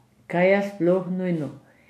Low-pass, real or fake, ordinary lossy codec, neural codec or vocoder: 14.4 kHz; real; none; none